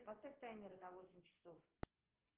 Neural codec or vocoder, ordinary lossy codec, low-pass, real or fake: vocoder, 24 kHz, 100 mel bands, Vocos; Opus, 16 kbps; 3.6 kHz; fake